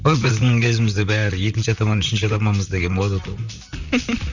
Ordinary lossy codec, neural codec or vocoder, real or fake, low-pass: none; vocoder, 44.1 kHz, 128 mel bands, Pupu-Vocoder; fake; 7.2 kHz